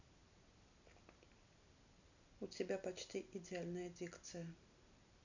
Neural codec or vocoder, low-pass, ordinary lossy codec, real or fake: none; 7.2 kHz; none; real